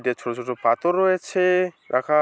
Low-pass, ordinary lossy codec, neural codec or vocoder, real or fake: none; none; none; real